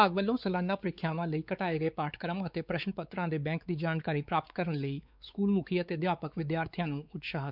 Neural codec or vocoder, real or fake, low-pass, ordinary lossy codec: codec, 16 kHz, 4 kbps, X-Codec, WavLM features, trained on Multilingual LibriSpeech; fake; 5.4 kHz; none